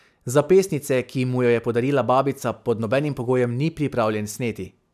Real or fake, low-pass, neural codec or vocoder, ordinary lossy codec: real; 14.4 kHz; none; none